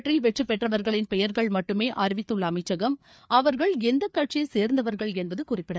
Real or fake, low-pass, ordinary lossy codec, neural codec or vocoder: fake; none; none; codec, 16 kHz, 4 kbps, FreqCodec, larger model